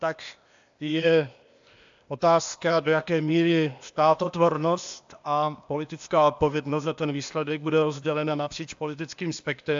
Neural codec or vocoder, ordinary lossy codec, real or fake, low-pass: codec, 16 kHz, 0.8 kbps, ZipCodec; AAC, 64 kbps; fake; 7.2 kHz